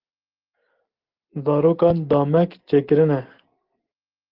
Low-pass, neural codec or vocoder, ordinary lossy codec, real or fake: 5.4 kHz; none; Opus, 16 kbps; real